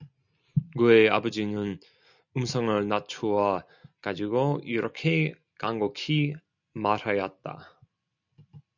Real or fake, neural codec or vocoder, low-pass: real; none; 7.2 kHz